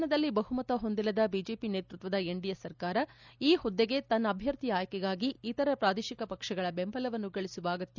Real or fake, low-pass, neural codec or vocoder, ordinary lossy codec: real; 7.2 kHz; none; none